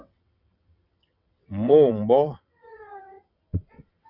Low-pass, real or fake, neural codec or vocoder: 5.4 kHz; fake; vocoder, 22.05 kHz, 80 mel bands, WaveNeXt